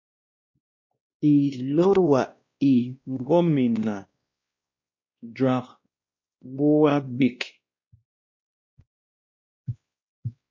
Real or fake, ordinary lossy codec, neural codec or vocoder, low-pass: fake; MP3, 48 kbps; codec, 16 kHz, 1 kbps, X-Codec, WavLM features, trained on Multilingual LibriSpeech; 7.2 kHz